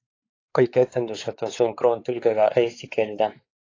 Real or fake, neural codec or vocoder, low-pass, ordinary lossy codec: fake; codec, 16 kHz, 4 kbps, X-Codec, WavLM features, trained on Multilingual LibriSpeech; 7.2 kHz; AAC, 32 kbps